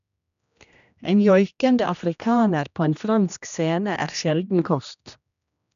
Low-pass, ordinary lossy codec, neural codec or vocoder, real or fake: 7.2 kHz; none; codec, 16 kHz, 1 kbps, X-Codec, HuBERT features, trained on general audio; fake